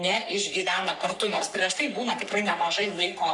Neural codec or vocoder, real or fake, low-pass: codec, 44.1 kHz, 3.4 kbps, Pupu-Codec; fake; 10.8 kHz